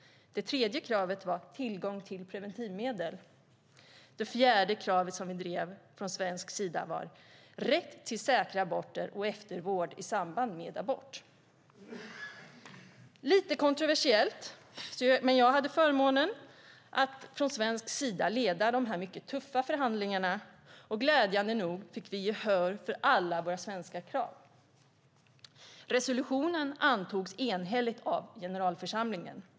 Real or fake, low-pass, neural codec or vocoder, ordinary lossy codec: real; none; none; none